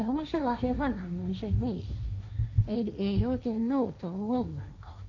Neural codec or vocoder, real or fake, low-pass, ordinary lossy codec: codec, 16 kHz, 1.1 kbps, Voila-Tokenizer; fake; 7.2 kHz; none